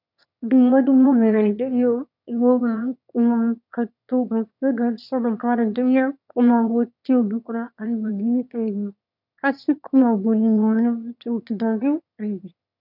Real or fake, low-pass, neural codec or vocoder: fake; 5.4 kHz; autoencoder, 22.05 kHz, a latent of 192 numbers a frame, VITS, trained on one speaker